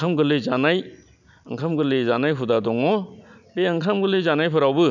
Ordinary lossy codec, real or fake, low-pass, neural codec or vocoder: none; real; 7.2 kHz; none